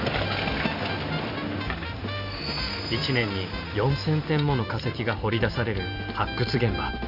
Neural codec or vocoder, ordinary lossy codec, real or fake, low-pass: none; none; real; 5.4 kHz